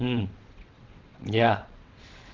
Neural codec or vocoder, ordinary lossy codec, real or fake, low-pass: vocoder, 22.05 kHz, 80 mel bands, WaveNeXt; Opus, 16 kbps; fake; 7.2 kHz